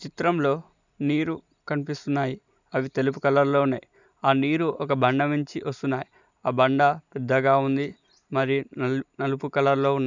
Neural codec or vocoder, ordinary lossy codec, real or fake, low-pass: none; none; real; 7.2 kHz